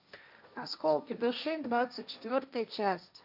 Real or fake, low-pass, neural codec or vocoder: fake; 5.4 kHz; codec, 16 kHz, 1.1 kbps, Voila-Tokenizer